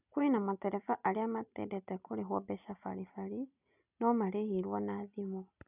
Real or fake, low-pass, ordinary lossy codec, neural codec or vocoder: real; 3.6 kHz; none; none